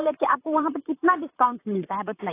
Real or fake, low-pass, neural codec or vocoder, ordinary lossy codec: fake; 3.6 kHz; vocoder, 44.1 kHz, 128 mel bands, Pupu-Vocoder; AAC, 24 kbps